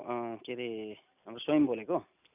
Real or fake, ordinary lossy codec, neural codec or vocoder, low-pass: real; none; none; 3.6 kHz